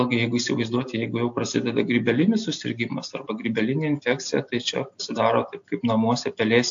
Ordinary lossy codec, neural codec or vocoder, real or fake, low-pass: AAC, 64 kbps; none; real; 7.2 kHz